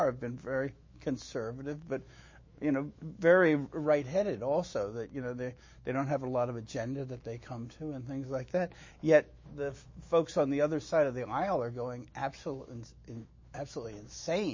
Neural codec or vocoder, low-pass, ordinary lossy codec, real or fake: autoencoder, 48 kHz, 128 numbers a frame, DAC-VAE, trained on Japanese speech; 7.2 kHz; MP3, 32 kbps; fake